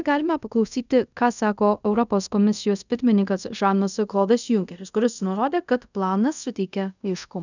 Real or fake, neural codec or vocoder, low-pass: fake; codec, 24 kHz, 0.5 kbps, DualCodec; 7.2 kHz